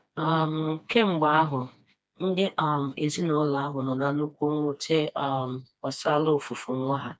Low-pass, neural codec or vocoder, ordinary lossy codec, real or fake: none; codec, 16 kHz, 2 kbps, FreqCodec, smaller model; none; fake